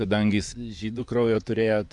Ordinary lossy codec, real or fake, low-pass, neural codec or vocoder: AAC, 64 kbps; fake; 10.8 kHz; vocoder, 24 kHz, 100 mel bands, Vocos